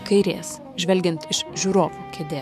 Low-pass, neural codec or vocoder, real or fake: 14.4 kHz; autoencoder, 48 kHz, 128 numbers a frame, DAC-VAE, trained on Japanese speech; fake